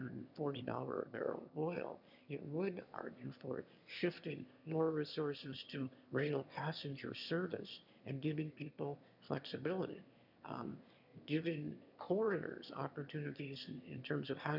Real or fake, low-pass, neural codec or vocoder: fake; 5.4 kHz; autoencoder, 22.05 kHz, a latent of 192 numbers a frame, VITS, trained on one speaker